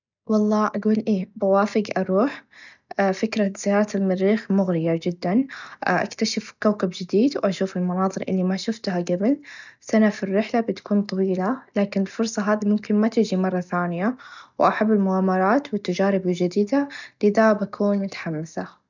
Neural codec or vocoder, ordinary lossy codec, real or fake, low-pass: none; none; real; 7.2 kHz